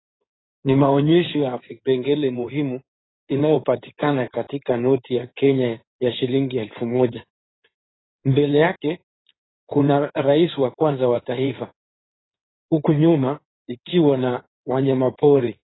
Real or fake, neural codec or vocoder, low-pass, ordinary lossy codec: fake; codec, 16 kHz in and 24 kHz out, 2.2 kbps, FireRedTTS-2 codec; 7.2 kHz; AAC, 16 kbps